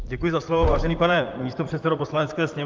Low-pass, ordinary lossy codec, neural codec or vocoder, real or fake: 7.2 kHz; Opus, 32 kbps; vocoder, 44.1 kHz, 128 mel bands, Pupu-Vocoder; fake